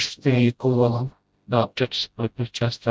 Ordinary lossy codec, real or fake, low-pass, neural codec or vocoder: none; fake; none; codec, 16 kHz, 0.5 kbps, FreqCodec, smaller model